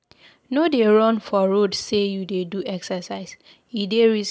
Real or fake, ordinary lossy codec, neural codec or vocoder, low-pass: real; none; none; none